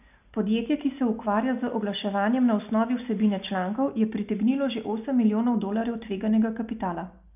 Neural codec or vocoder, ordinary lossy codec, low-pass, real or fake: none; none; 3.6 kHz; real